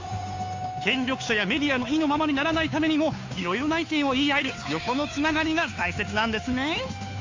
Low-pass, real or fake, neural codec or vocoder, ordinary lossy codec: 7.2 kHz; fake; codec, 16 kHz, 2 kbps, FunCodec, trained on Chinese and English, 25 frames a second; AAC, 48 kbps